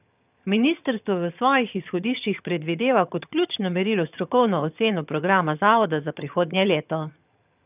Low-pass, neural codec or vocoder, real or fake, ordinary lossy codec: 3.6 kHz; vocoder, 22.05 kHz, 80 mel bands, HiFi-GAN; fake; none